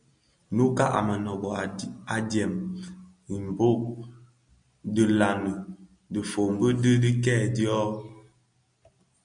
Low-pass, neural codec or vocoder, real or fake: 9.9 kHz; none; real